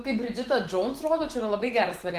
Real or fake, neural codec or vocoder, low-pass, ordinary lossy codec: fake; autoencoder, 48 kHz, 128 numbers a frame, DAC-VAE, trained on Japanese speech; 14.4 kHz; Opus, 16 kbps